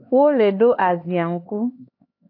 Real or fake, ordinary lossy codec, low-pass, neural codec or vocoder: fake; AAC, 32 kbps; 5.4 kHz; codec, 16 kHz, 4 kbps, X-Codec, HuBERT features, trained on LibriSpeech